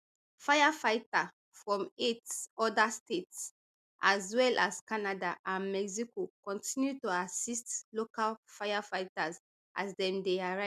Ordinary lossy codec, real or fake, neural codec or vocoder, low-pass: MP3, 96 kbps; real; none; 14.4 kHz